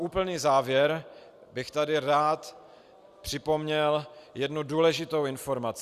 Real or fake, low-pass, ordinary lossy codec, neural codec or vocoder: real; 14.4 kHz; Opus, 64 kbps; none